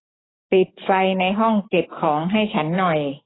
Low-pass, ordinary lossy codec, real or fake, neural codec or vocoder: 7.2 kHz; AAC, 16 kbps; fake; codec, 44.1 kHz, 7.8 kbps, DAC